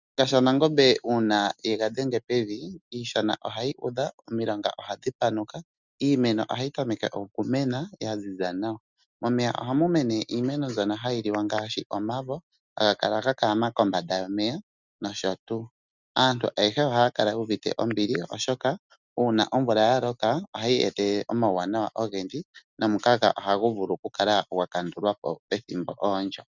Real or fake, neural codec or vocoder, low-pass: real; none; 7.2 kHz